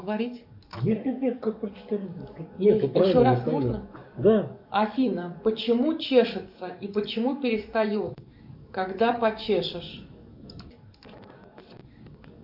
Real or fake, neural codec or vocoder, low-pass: fake; codec, 44.1 kHz, 7.8 kbps, DAC; 5.4 kHz